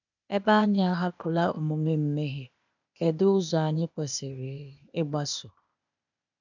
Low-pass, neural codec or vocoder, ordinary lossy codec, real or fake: 7.2 kHz; codec, 16 kHz, 0.8 kbps, ZipCodec; none; fake